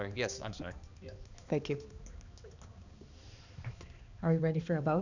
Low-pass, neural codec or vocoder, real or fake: 7.2 kHz; codec, 16 kHz, 2 kbps, X-Codec, HuBERT features, trained on balanced general audio; fake